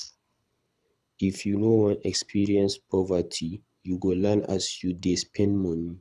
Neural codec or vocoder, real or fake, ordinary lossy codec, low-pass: codec, 24 kHz, 6 kbps, HILCodec; fake; none; none